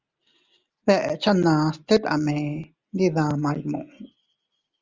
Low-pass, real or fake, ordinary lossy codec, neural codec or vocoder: 7.2 kHz; real; Opus, 32 kbps; none